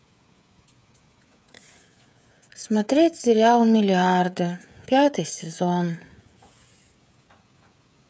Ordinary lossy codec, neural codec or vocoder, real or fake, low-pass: none; codec, 16 kHz, 16 kbps, FreqCodec, smaller model; fake; none